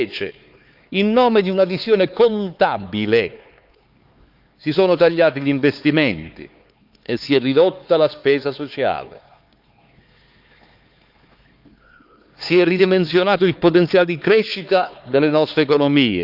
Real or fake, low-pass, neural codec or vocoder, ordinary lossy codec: fake; 5.4 kHz; codec, 16 kHz, 4 kbps, X-Codec, HuBERT features, trained on LibriSpeech; Opus, 24 kbps